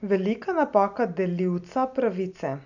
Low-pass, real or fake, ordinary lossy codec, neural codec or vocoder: 7.2 kHz; real; none; none